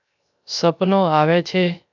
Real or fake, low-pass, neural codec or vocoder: fake; 7.2 kHz; codec, 16 kHz, 0.3 kbps, FocalCodec